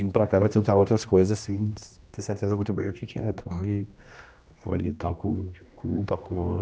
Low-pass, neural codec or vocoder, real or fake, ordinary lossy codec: none; codec, 16 kHz, 1 kbps, X-Codec, HuBERT features, trained on general audio; fake; none